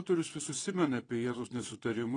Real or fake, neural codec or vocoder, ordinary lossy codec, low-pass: fake; vocoder, 22.05 kHz, 80 mel bands, WaveNeXt; AAC, 32 kbps; 9.9 kHz